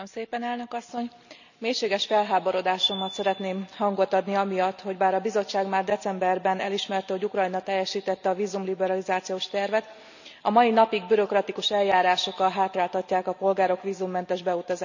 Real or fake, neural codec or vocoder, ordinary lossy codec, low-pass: real; none; none; 7.2 kHz